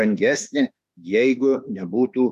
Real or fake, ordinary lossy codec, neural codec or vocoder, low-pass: fake; MP3, 96 kbps; autoencoder, 48 kHz, 32 numbers a frame, DAC-VAE, trained on Japanese speech; 14.4 kHz